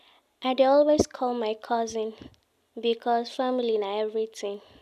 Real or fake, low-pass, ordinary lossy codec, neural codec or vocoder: real; 14.4 kHz; none; none